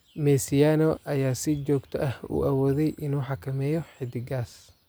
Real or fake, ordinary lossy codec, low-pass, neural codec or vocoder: real; none; none; none